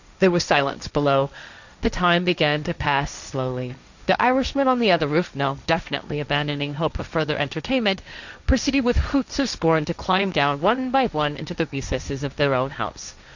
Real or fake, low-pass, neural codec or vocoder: fake; 7.2 kHz; codec, 16 kHz, 1.1 kbps, Voila-Tokenizer